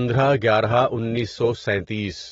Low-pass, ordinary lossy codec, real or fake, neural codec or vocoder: 7.2 kHz; AAC, 24 kbps; real; none